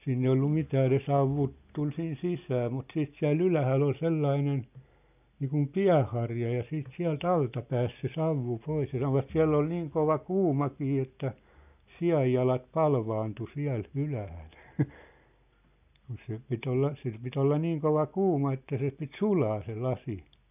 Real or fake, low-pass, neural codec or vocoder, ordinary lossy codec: real; 3.6 kHz; none; none